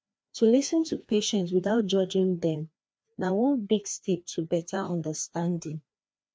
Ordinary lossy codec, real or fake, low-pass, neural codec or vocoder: none; fake; none; codec, 16 kHz, 2 kbps, FreqCodec, larger model